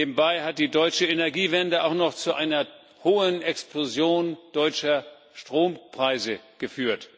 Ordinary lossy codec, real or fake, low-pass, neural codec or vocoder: none; real; none; none